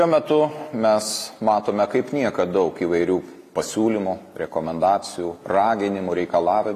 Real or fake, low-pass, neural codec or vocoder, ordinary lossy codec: real; 14.4 kHz; none; AAC, 48 kbps